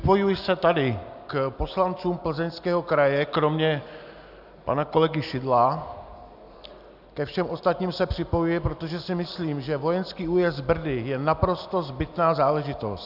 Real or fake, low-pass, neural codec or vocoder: real; 5.4 kHz; none